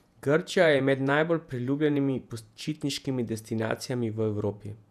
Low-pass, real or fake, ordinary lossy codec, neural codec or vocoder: 14.4 kHz; fake; none; vocoder, 48 kHz, 128 mel bands, Vocos